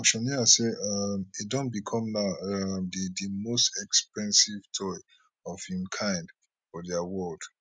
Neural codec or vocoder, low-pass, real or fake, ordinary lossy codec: none; none; real; none